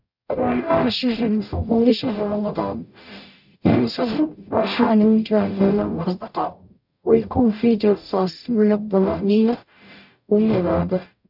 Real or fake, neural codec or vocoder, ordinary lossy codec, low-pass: fake; codec, 44.1 kHz, 0.9 kbps, DAC; none; 5.4 kHz